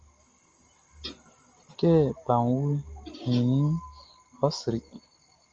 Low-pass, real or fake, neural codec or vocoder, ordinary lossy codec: 7.2 kHz; real; none; Opus, 24 kbps